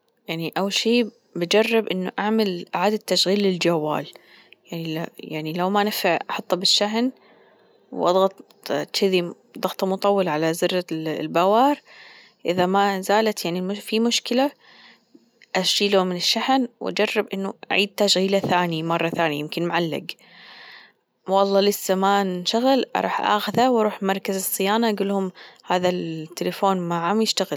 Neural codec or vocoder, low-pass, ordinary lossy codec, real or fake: none; none; none; real